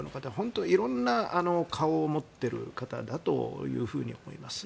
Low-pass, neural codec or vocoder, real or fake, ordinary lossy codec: none; none; real; none